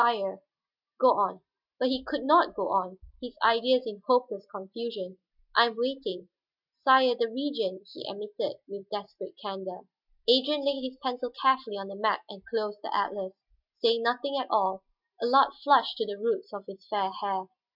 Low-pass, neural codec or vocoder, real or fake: 5.4 kHz; none; real